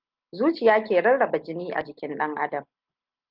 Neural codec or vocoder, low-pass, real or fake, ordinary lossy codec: none; 5.4 kHz; real; Opus, 32 kbps